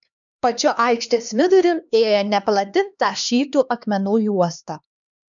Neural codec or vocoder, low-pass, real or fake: codec, 16 kHz, 2 kbps, X-Codec, HuBERT features, trained on LibriSpeech; 7.2 kHz; fake